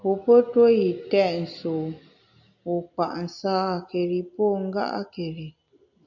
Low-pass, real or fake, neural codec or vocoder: 7.2 kHz; real; none